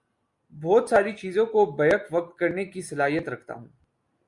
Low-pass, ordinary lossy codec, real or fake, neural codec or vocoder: 10.8 kHz; Opus, 64 kbps; real; none